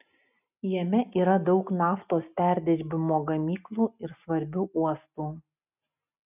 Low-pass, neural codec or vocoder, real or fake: 3.6 kHz; none; real